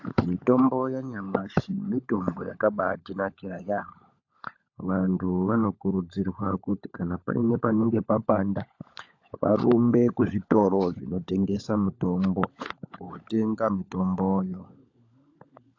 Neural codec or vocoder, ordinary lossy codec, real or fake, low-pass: codec, 16 kHz, 16 kbps, FunCodec, trained on LibriTTS, 50 frames a second; AAC, 48 kbps; fake; 7.2 kHz